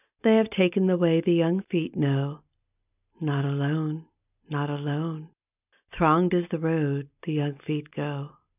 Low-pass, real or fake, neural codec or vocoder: 3.6 kHz; real; none